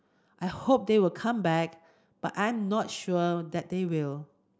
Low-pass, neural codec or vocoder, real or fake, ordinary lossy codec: none; none; real; none